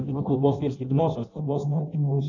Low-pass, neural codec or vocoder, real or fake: 7.2 kHz; codec, 16 kHz in and 24 kHz out, 0.6 kbps, FireRedTTS-2 codec; fake